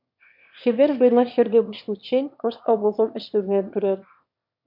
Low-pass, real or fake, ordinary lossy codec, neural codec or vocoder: 5.4 kHz; fake; MP3, 48 kbps; autoencoder, 22.05 kHz, a latent of 192 numbers a frame, VITS, trained on one speaker